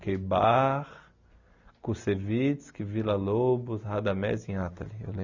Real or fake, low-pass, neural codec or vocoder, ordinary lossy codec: real; 7.2 kHz; none; none